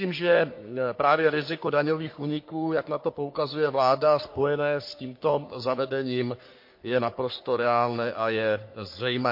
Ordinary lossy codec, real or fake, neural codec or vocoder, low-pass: MP3, 32 kbps; fake; codec, 44.1 kHz, 3.4 kbps, Pupu-Codec; 5.4 kHz